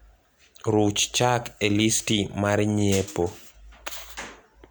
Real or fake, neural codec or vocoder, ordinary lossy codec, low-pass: real; none; none; none